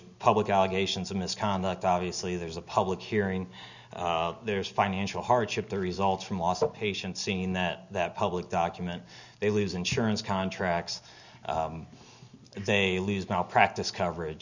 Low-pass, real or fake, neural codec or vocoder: 7.2 kHz; real; none